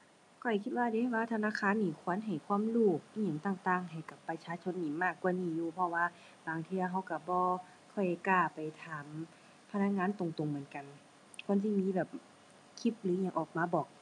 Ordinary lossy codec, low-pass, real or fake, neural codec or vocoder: none; none; real; none